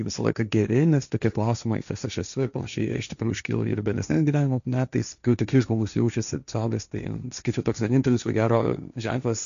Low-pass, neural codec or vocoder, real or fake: 7.2 kHz; codec, 16 kHz, 1.1 kbps, Voila-Tokenizer; fake